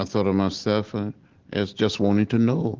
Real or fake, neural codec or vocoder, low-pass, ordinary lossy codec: real; none; 7.2 kHz; Opus, 16 kbps